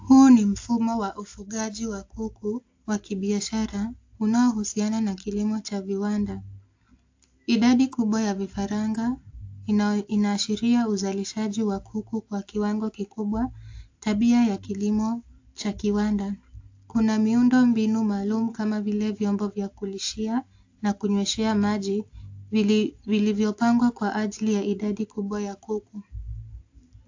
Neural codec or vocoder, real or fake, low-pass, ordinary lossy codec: none; real; 7.2 kHz; AAC, 48 kbps